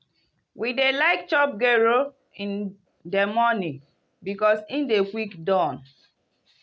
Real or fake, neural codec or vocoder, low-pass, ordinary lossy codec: real; none; none; none